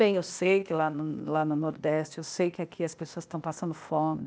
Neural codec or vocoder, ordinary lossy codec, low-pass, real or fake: codec, 16 kHz, 0.8 kbps, ZipCodec; none; none; fake